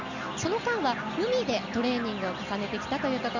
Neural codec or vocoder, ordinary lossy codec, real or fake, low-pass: none; none; real; 7.2 kHz